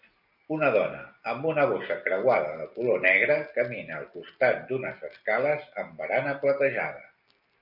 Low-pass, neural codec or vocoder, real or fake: 5.4 kHz; none; real